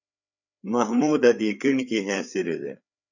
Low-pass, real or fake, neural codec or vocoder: 7.2 kHz; fake; codec, 16 kHz, 4 kbps, FreqCodec, larger model